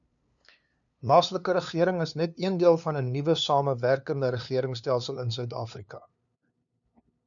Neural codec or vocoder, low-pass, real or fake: codec, 16 kHz, 2 kbps, FunCodec, trained on LibriTTS, 25 frames a second; 7.2 kHz; fake